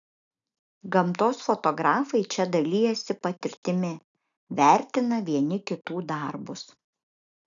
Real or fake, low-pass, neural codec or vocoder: real; 7.2 kHz; none